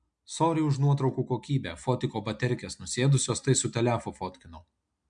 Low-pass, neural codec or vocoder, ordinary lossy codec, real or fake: 9.9 kHz; none; MP3, 64 kbps; real